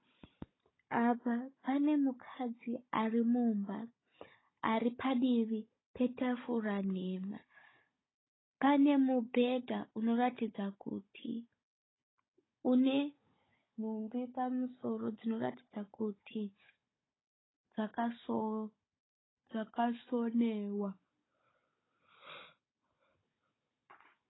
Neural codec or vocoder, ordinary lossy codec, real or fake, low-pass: codec, 16 kHz, 16 kbps, FunCodec, trained on Chinese and English, 50 frames a second; AAC, 16 kbps; fake; 7.2 kHz